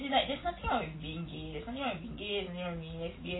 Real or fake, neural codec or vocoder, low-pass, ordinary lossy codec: real; none; 7.2 kHz; AAC, 16 kbps